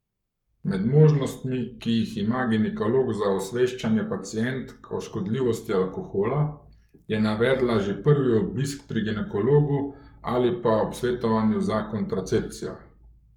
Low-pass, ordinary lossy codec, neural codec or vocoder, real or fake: 19.8 kHz; none; codec, 44.1 kHz, 7.8 kbps, Pupu-Codec; fake